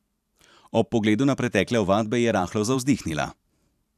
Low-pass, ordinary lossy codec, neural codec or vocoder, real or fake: 14.4 kHz; none; vocoder, 44.1 kHz, 128 mel bands every 512 samples, BigVGAN v2; fake